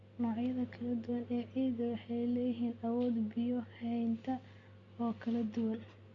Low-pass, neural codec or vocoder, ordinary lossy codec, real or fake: 7.2 kHz; none; Opus, 64 kbps; real